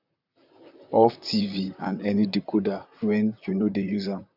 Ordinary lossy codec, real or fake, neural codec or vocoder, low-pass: none; fake; vocoder, 44.1 kHz, 128 mel bands, Pupu-Vocoder; 5.4 kHz